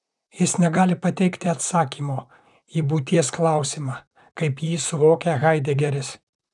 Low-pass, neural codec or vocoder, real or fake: 10.8 kHz; vocoder, 44.1 kHz, 128 mel bands, Pupu-Vocoder; fake